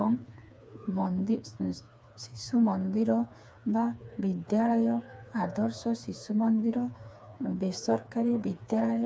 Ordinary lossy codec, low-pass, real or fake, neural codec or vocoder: none; none; fake; codec, 16 kHz, 4 kbps, FreqCodec, smaller model